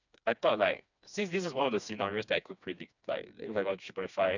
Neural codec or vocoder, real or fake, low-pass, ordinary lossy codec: codec, 16 kHz, 2 kbps, FreqCodec, smaller model; fake; 7.2 kHz; none